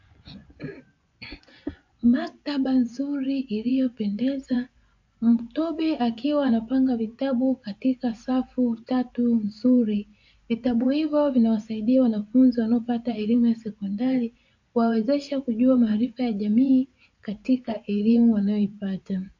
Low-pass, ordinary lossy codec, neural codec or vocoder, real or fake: 7.2 kHz; MP3, 48 kbps; vocoder, 24 kHz, 100 mel bands, Vocos; fake